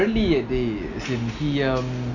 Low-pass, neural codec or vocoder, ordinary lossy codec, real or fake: 7.2 kHz; none; none; real